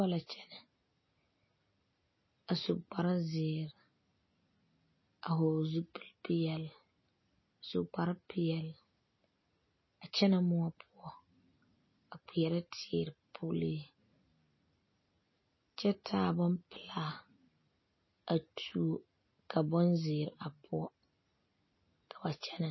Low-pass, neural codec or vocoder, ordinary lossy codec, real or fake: 7.2 kHz; none; MP3, 24 kbps; real